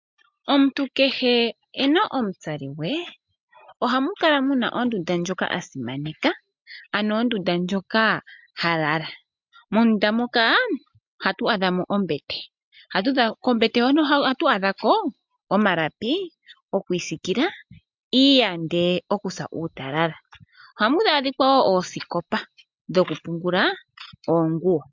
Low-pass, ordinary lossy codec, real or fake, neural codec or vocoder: 7.2 kHz; MP3, 64 kbps; real; none